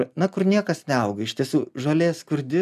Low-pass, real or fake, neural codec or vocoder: 14.4 kHz; real; none